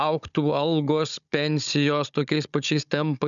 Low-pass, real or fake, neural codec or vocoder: 7.2 kHz; fake; codec, 16 kHz, 16 kbps, FunCodec, trained on Chinese and English, 50 frames a second